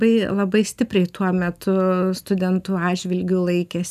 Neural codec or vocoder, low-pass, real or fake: none; 14.4 kHz; real